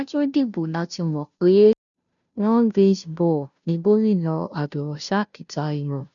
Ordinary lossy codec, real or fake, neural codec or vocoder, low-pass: none; fake; codec, 16 kHz, 0.5 kbps, FunCodec, trained on Chinese and English, 25 frames a second; 7.2 kHz